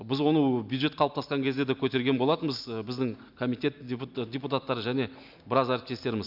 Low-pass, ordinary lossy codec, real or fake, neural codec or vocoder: 5.4 kHz; none; real; none